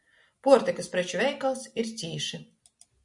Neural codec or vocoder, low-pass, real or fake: none; 10.8 kHz; real